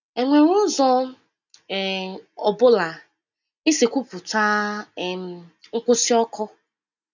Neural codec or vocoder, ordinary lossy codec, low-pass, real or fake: none; none; 7.2 kHz; real